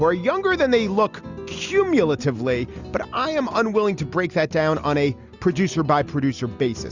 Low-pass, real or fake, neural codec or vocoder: 7.2 kHz; real; none